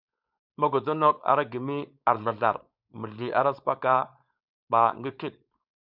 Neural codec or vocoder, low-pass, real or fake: codec, 16 kHz, 4.8 kbps, FACodec; 5.4 kHz; fake